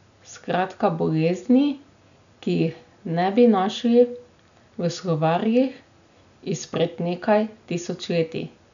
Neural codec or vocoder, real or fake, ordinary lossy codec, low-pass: none; real; none; 7.2 kHz